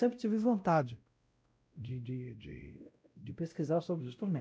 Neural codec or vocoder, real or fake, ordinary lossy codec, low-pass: codec, 16 kHz, 0.5 kbps, X-Codec, WavLM features, trained on Multilingual LibriSpeech; fake; none; none